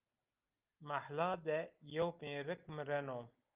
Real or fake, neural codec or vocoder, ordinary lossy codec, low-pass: real; none; Opus, 32 kbps; 3.6 kHz